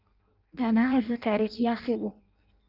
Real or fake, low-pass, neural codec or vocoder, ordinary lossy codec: fake; 5.4 kHz; codec, 16 kHz in and 24 kHz out, 0.6 kbps, FireRedTTS-2 codec; Opus, 24 kbps